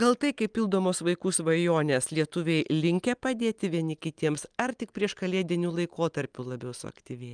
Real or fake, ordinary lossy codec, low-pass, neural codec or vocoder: real; Opus, 32 kbps; 9.9 kHz; none